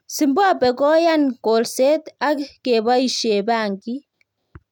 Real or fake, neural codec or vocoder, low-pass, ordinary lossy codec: real; none; 19.8 kHz; none